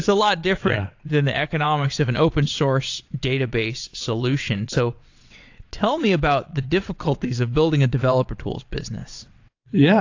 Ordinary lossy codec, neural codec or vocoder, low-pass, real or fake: AAC, 48 kbps; vocoder, 22.05 kHz, 80 mel bands, WaveNeXt; 7.2 kHz; fake